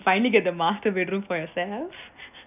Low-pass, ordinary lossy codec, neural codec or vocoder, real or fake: 3.6 kHz; none; none; real